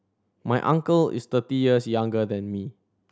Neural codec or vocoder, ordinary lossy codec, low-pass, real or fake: none; none; none; real